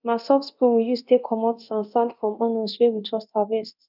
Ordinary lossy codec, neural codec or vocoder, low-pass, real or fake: none; codec, 24 kHz, 0.9 kbps, DualCodec; 5.4 kHz; fake